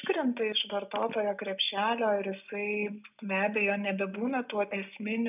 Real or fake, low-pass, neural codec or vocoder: real; 3.6 kHz; none